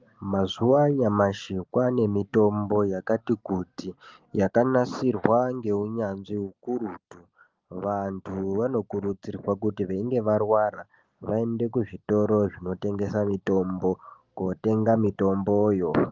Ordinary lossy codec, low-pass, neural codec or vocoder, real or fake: Opus, 32 kbps; 7.2 kHz; none; real